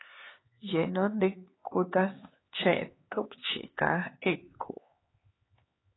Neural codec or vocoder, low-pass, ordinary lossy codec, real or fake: codec, 16 kHz, 4 kbps, X-Codec, HuBERT features, trained on LibriSpeech; 7.2 kHz; AAC, 16 kbps; fake